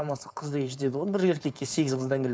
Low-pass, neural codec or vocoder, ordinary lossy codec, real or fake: none; codec, 16 kHz, 4.8 kbps, FACodec; none; fake